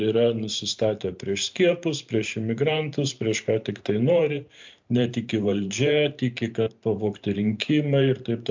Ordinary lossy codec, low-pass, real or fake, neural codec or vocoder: MP3, 64 kbps; 7.2 kHz; fake; vocoder, 44.1 kHz, 128 mel bands every 512 samples, BigVGAN v2